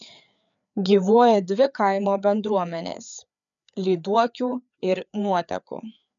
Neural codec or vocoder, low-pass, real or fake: codec, 16 kHz, 4 kbps, FreqCodec, larger model; 7.2 kHz; fake